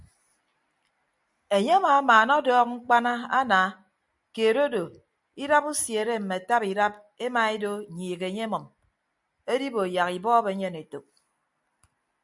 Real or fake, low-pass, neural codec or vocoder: real; 10.8 kHz; none